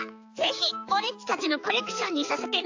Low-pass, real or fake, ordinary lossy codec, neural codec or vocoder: 7.2 kHz; fake; AAC, 48 kbps; codec, 16 kHz, 4 kbps, X-Codec, HuBERT features, trained on balanced general audio